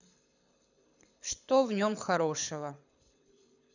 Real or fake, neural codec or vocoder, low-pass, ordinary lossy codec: fake; codec, 24 kHz, 6 kbps, HILCodec; 7.2 kHz; none